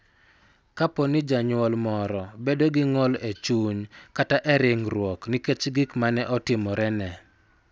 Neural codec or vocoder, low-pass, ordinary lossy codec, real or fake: none; none; none; real